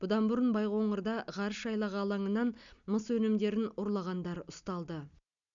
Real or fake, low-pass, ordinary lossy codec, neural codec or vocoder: real; 7.2 kHz; none; none